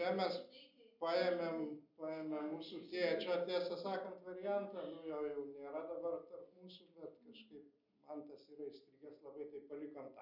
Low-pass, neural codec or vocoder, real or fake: 5.4 kHz; none; real